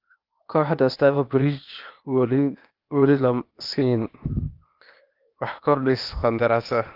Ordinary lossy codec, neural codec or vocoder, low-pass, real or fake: Opus, 32 kbps; codec, 16 kHz, 0.8 kbps, ZipCodec; 5.4 kHz; fake